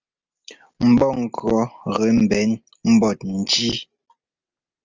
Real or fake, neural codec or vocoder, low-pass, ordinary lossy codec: real; none; 7.2 kHz; Opus, 24 kbps